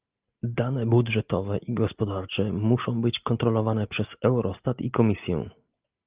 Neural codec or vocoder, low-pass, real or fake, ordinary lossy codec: none; 3.6 kHz; real; Opus, 32 kbps